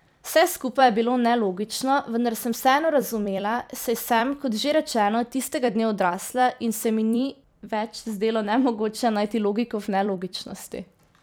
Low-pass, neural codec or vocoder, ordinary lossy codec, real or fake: none; vocoder, 44.1 kHz, 128 mel bands every 256 samples, BigVGAN v2; none; fake